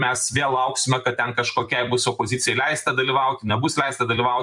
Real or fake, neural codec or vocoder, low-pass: fake; vocoder, 44.1 kHz, 128 mel bands every 256 samples, BigVGAN v2; 10.8 kHz